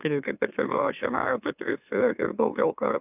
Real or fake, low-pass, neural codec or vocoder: fake; 3.6 kHz; autoencoder, 44.1 kHz, a latent of 192 numbers a frame, MeloTTS